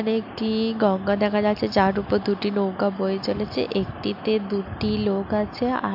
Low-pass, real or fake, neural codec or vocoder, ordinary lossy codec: 5.4 kHz; real; none; MP3, 48 kbps